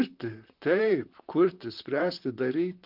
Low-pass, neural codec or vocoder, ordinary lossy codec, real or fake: 5.4 kHz; vocoder, 44.1 kHz, 128 mel bands, Pupu-Vocoder; Opus, 32 kbps; fake